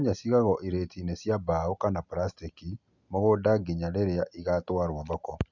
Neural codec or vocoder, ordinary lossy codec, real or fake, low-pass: none; none; real; 7.2 kHz